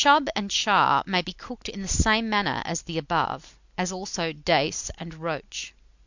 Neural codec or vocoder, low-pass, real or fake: none; 7.2 kHz; real